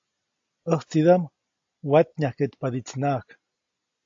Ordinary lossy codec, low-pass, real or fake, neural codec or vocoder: AAC, 48 kbps; 7.2 kHz; real; none